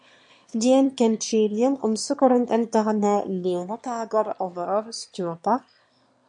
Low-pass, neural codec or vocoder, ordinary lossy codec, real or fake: 9.9 kHz; autoencoder, 22.05 kHz, a latent of 192 numbers a frame, VITS, trained on one speaker; MP3, 48 kbps; fake